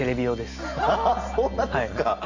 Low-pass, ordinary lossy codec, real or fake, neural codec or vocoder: 7.2 kHz; Opus, 64 kbps; real; none